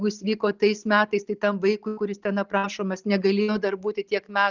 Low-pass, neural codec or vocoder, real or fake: 7.2 kHz; none; real